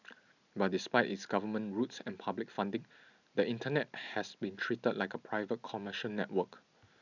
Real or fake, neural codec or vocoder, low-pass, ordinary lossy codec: real; none; 7.2 kHz; none